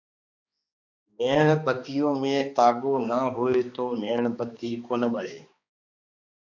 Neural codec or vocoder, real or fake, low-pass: codec, 16 kHz, 2 kbps, X-Codec, HuBERT features, trained on balanced general audio; fake; 7.2 kHz